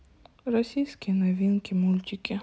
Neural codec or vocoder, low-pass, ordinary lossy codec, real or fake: none; none; none; real